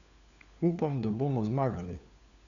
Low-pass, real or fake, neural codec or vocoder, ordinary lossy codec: 7.2 kHz; fake; codec, 16 kHz, 4 kbps, FunCodec, trained on LibriTTS, 50 frames a second; none